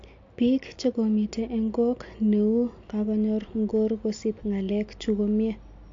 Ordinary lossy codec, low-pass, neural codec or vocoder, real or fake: AAC, 48 kbps; 7.2 kHz; none; real